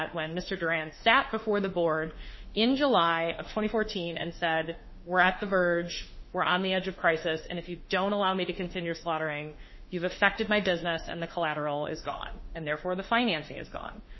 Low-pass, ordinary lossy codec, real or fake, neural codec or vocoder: 7.2 kHz; MP3, 24 kbps; fake; autoencoder, 48 kHz, 32 numbers a frame, DAC-VAE, trained on Japanese speech